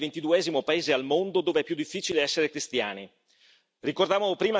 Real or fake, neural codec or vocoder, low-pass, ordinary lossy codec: real; none; none; none